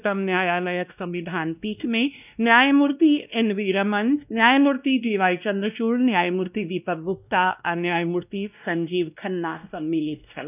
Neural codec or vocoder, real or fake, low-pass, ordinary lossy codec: codec, 16 kHz, 1 kbps, X-Codec, WavLM features, trained on Multilingual LibriSpeech; fake; 3.6 kHz; none